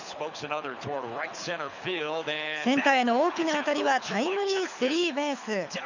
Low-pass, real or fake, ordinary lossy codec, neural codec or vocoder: 7.2 kHz; fake; none; codec, 24 kHz, 6 kbps, HILCodec